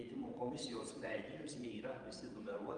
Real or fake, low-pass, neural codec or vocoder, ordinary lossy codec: fake; 9.9 kHz; vocoder, 22.05 kHz, 80 mel bands, Vocos; Opus, 32 kbps